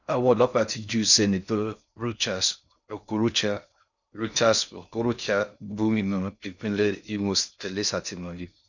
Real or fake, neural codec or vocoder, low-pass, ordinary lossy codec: fake; codec, 16 kHz in and 24 kHz out, 0.6 kbps, FocalCodec, streaming, 4096 codes; 7.2 kHz; none